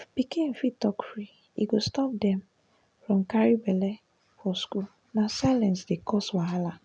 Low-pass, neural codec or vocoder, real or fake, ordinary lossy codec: 9.9 kHz; none; real; none